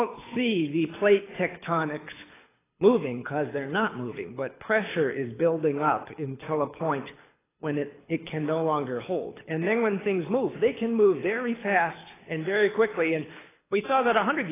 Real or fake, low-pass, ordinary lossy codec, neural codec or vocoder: fake; 3.6 kHz; AAC, 16 kbps; codec, 24 kHz, 6 kbps, HILCodec